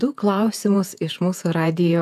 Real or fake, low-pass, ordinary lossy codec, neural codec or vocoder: fake; 14.4 kHz; AAC, 96 kbps; vocoder, 48 kHz, 128 mel bands, Vocos